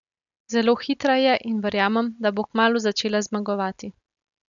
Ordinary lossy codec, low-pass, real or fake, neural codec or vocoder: none; 7.2 kHz; real; none